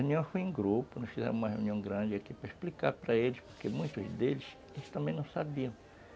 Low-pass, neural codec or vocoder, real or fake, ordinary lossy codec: none; none; real; none